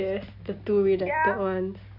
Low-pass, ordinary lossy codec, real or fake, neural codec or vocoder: 5.4 kHz; none; real; none